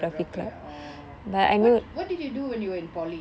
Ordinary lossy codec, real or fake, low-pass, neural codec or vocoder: none; real; none; none